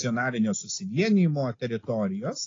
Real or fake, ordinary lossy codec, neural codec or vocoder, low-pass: real; AAC, 32 kbps; none; 7.2 kHz